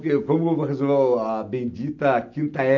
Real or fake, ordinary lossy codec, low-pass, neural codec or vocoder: real; none; 7.2 kHz; none